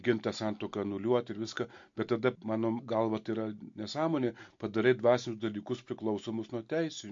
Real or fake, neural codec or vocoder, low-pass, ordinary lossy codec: real; none; 7.2 kHz; MP3, 48 kbps